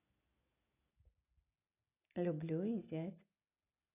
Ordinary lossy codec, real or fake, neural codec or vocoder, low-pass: none; real; none; 3.6 kHz